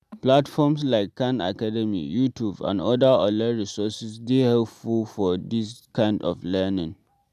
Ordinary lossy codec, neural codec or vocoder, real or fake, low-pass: none; none; real; 14.4 kHz